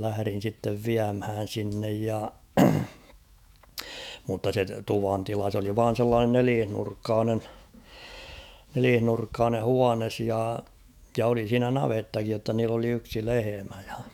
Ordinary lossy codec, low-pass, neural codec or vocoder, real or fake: none; 19.8 kHz; autoencoder, 48 kHz, 128 numbers a frame, DAC-VAE, trained on Japanese speech; fake